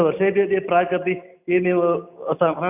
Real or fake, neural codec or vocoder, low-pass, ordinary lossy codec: real; none; 3.6 kHz; none